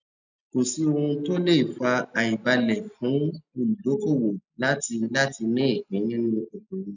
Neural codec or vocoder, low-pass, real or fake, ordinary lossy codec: none; 7.2 kHz; real; none